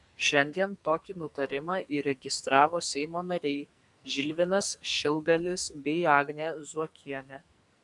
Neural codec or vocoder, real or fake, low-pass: codec, 44.1 kHz, 2.6 kbps, SNAC; fake; 10.8 kHz